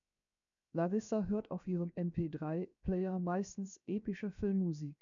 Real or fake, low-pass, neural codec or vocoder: fake; 7.2 kHz; codec, 16 kHz, 0.7 kbps, FocalCodec